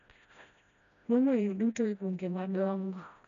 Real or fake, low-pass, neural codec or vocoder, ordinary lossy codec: fake; 7.2 kHz; codec, 16 kHz, 1 kbps, FreqCodec, smaller model; none